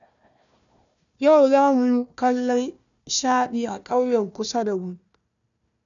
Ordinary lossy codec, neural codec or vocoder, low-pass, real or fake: none; codec, 16 kHz, 1 kbps, FunCodec, trained on Chinese and English, 50 frames a second; 7.2 kHz; fake